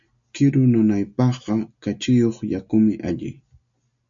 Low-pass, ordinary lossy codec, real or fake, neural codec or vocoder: 7.2 kHz; MP3, 64 kbps; real; none